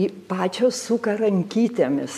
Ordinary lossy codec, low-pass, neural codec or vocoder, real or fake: MP3, 96 kbps; 14.4 kHz; vocoder, 44.1 kHz, 128 mel bands every 256 samples, BigVGAN v2; fake